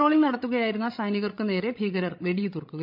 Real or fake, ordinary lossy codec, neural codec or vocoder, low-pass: fake; MP3, 48 kbps; codec, 16 kHz, 16 kbps, FreqCodec, larger model; 5.4 kHz